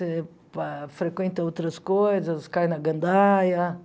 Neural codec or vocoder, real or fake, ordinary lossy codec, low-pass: none; real; none; none